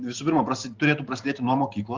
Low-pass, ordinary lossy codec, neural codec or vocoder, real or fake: 7.2 kHz; Opus, 32 kbps; none; real